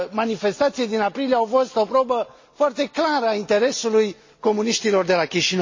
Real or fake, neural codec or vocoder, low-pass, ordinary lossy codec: real; none; 7.2 kHz; MP3, 32 kbps